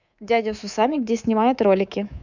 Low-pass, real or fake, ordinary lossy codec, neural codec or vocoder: 7.2 kHz; fake; none; codec, 24 kHz, 3.1 kbps, DualCodec